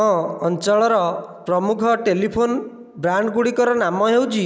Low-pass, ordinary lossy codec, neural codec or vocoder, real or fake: none; none; none; real